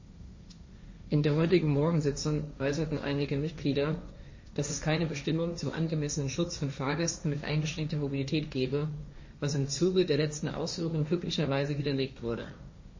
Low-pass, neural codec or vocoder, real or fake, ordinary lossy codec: 7.2 kHz; codec, 16 kHz, 1.1 kbps, Voila-Tokenizer; fake; MP3, 32 kbps